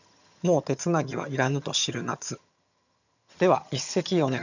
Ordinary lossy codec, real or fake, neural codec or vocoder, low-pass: none; fake; vocoder, 22.05 kHz, 80 mel bands, HiFi-GAN; 7.2 kHz